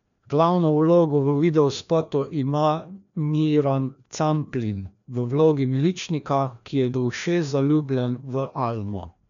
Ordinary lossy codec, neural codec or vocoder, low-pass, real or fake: none; codec, 16 kHz, 1 kbps, FreqCodec, larger model; 7.2 kHz; fake